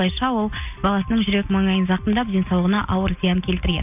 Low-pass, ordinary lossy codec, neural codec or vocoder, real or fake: 3.6 kHz; none; none; real